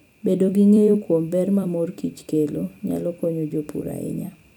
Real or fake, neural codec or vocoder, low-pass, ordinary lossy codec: fake; vocoder, 44.1 kHz, 128 mel bands every 256 samples, BigVGAN v2; 19.8 kHz; none